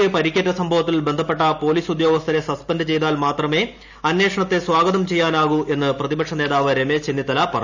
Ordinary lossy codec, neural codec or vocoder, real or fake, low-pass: none; none; real; 7.2 kHz